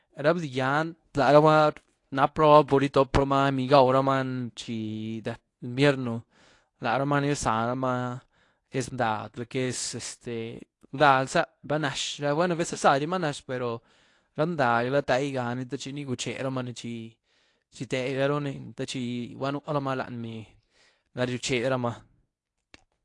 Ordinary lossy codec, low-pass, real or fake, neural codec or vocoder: AAC, 48 kbps; 10.8 kHz; fake; codec, 24 kHz, 0.9 kbps, WavTokenizer, medium speech release version 1